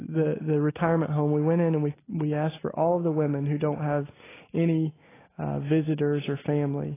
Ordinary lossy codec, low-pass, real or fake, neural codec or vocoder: AAC, 16 kbps; 3.6 kHz; real; none